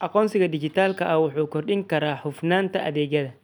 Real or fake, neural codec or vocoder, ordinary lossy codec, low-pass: real; none; none; 19.8 kHz